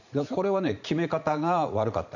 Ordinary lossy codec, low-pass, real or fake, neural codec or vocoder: none; 7.2 kHz; fake; vocoder, 44.1 kHz, 80 mel bands, Vocos